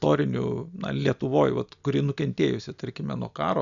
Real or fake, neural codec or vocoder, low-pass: real; none; 7.2 kHz